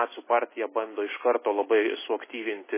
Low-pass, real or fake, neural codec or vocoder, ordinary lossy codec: 3.6 kHz; real; none; MP3, 16 kbps